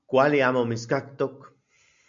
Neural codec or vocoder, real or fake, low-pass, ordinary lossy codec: none; real; 7.2 kHz; MP3, 64 kbps